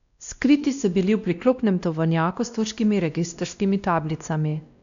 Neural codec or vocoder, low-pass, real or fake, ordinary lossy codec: codec, 16 kHz, 1 kbps, X-Codec, WavLM features, trained on Multilingual LibriSpeech; 7.2 kHz; fake; none